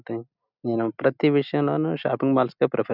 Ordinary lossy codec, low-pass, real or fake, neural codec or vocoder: none; 5.4 kHz; real; none